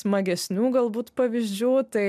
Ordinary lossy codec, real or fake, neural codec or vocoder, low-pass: MP3, 96 kbps; real; none; 14.4 kHz